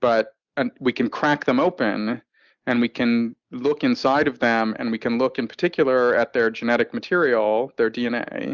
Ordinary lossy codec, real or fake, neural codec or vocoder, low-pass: Opus, 64 kbps; real; none; 7.2 kHz